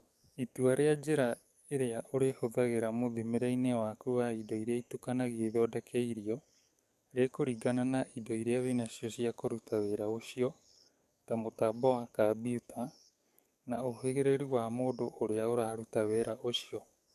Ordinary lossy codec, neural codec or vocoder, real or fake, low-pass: none; codec, 44.1 kHz, 7.8 kbps, DAC; fake; 14.4 kHz